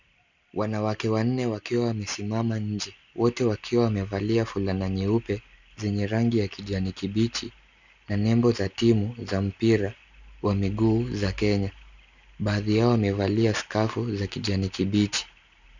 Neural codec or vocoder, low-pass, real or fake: none; 7.2 kHz; real